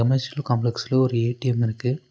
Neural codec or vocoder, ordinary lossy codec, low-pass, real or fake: none; none; none; real